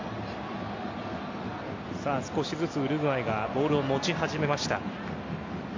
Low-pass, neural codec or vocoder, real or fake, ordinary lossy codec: 7.2 kHz; none; real; MP3, 48 kbps